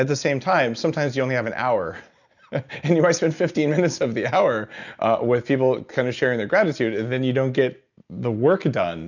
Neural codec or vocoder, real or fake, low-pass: none; real; 7.2 kHz